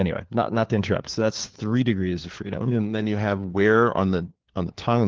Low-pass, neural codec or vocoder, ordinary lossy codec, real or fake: 7.2 kHz; codec, 16 kHz, 4 kbps, FunCodec, trained on LibriTTS, 50 frames a second; Opus, 16 kbps; fake